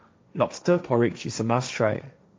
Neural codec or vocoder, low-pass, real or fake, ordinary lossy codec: codec, 16 kHz, 1.1 kbps, Voila-Tokenizer; none; fake; none